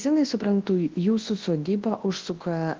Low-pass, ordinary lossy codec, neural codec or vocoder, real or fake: 7.2 kHz; Opus, 16 kbps; codec, 24 kHz, 0.9 kbps, WavTokenizer, large speech release; fake